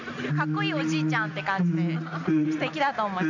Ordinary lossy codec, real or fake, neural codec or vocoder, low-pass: none; real; none; 7.2 kHz